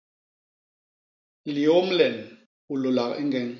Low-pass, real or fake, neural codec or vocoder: 7.2 kHz; real; none